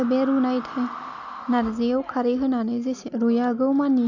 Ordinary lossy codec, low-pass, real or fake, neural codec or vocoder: none; 7.2 kHz; real; none